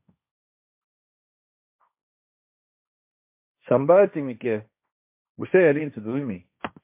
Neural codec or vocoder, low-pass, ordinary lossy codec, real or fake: codec, 16 kHz, 1.1 kbps, Voila-Tokenizer; 3.6 kHz; MP3, 32 kbps; fake